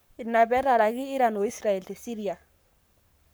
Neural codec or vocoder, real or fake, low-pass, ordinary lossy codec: codec, 44.1 kHz, 7.8 kbps, Pupu-Codec; fake; none; none